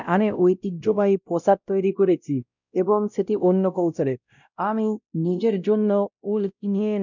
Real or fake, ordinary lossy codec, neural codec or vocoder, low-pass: fake; none; codec, 16 kHz, 0.5 kbps, X-Codec, WavLM features, trained on Multilingual LibriSpeech; 7.2 kHz